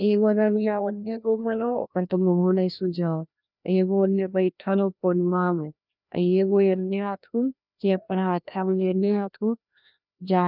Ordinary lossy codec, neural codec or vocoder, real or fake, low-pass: none; codec, 16 kHz, 1 kbps, FreqCodec, larger model; fake; 5.4 kHz